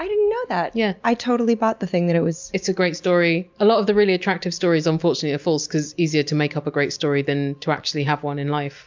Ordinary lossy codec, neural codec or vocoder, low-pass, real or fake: MP3, 64 kbps; none; 7.2 kHz; real